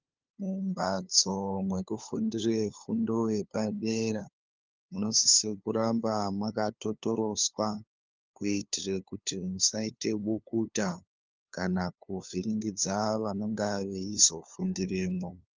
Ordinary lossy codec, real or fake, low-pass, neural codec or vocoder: Opus, 32 kbps; fake; 7.2 kHz; codec, 16 kHz, 2 kbps, FunCodec, trained on LibriTTS, 25 frames a second